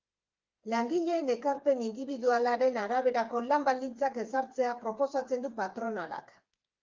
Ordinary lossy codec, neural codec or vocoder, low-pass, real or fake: Opus, 32 kbps; codec, 16 kHz, 4 kbps, FreqCodec, smaller model; 7.2 kHz; fake